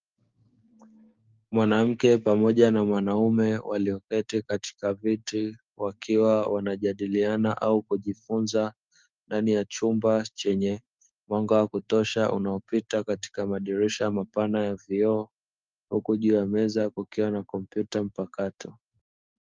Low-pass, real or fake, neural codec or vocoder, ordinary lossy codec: 7.2 kHz; fake; codec, 16 kHz, 6 kbps, DAC; Opus, 32 kbps